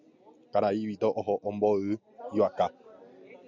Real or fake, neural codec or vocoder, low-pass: real; none; 7.2 kHz